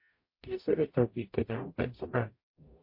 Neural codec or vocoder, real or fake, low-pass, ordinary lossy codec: codec, 44.1 kHz, 0.9 kbps, DAC; fake; 5.4 kHz; MP3, 32 kbps